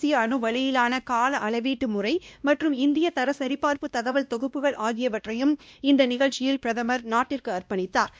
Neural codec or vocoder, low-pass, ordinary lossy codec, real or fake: codec, 16 kHz, 1 kbps, X-Codec, WavLM features, trained on Multilingual LibriSpeech; none; none; fake